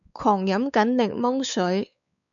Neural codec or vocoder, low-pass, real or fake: codec, 16 kHz, 4 kbps, X-Codec, WavLM features, trained on Multilingual LibriSpeech; 7.2 kHz; fake